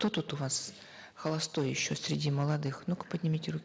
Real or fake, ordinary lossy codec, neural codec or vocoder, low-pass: real; none; none; none